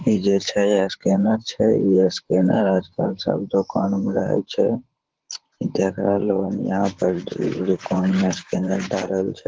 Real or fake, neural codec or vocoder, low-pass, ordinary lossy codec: fake; vocoder, 44.1 kHz, 128 mel bands, Pupu-Vocoder; 7.2 kHz; Opus, 16 kbps